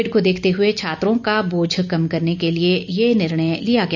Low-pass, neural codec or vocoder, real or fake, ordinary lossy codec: 7.2 kHz; none; real; none